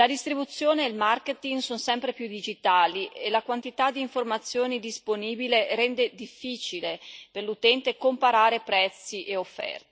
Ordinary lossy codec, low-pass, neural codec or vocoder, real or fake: none; none; none; real